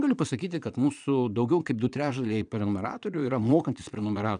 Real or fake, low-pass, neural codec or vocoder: fake; 9.9 kHz; vocoder, 22.05 kHz, 80 mel bands, Vocos